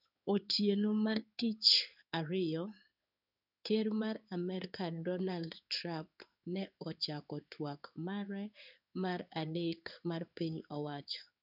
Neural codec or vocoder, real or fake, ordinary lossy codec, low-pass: codec, 16 kHz in and 24 kHz out, 1 kbps, XY-Tokenizer; fake; none; 5.4 kHz